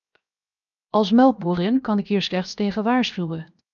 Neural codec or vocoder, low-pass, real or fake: codec, 16 kHz, 0.7 kbps, FocalCodec; 7.2 kHz; fake